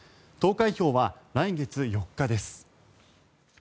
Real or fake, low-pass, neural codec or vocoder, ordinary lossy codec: real; none; none; none